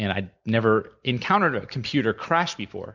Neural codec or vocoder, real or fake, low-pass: none; real; 7.2 kHz